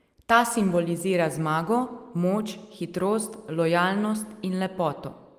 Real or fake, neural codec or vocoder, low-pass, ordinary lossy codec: real; none; 14.4 kHz; Opus, 32 kbps